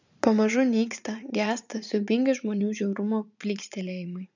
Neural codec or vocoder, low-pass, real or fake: vocoder, 44.1 kHz, 80 mel bands, Vocos; 7.2 kHz; fake